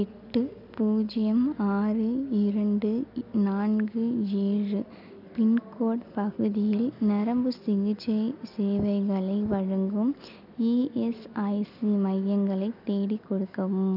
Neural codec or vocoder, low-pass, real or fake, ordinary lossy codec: none; 5.4 kHz; real; none